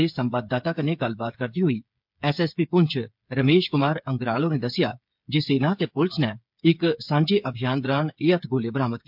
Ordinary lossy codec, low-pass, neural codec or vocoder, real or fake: MP3, 48 kbps; 5.4 kHz; codec, 16 kHz, 8 kbps, FreqCodec, smaller model; fake